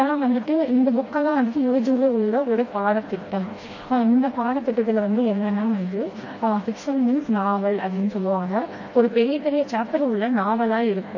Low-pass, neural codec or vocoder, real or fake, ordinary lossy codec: 7.2 kHz; codec, 16 kHz, 1 kbps, FreqCodec, smaller model; fake; MP3, 32 kbps